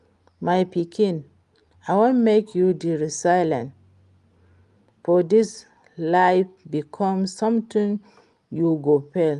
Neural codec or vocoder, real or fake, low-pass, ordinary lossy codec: none; real; 10.8 kHz; Opus, 32 kbps